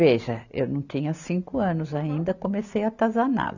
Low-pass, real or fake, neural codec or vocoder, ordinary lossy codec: 7.2 kHz; real; none; none